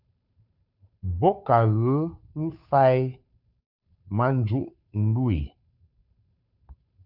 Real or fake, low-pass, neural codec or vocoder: fake; 5.4 kHz; codec, 16 kHz, 2 kbps, FunCodec, trained on Chinese and English, 25 frames a second